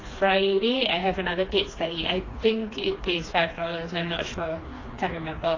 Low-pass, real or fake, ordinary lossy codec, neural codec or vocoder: 7.2 kHz; fake; AAC, 32 kbps; codec, 16 kHz, 2 kbps, FreqCodec, smaller model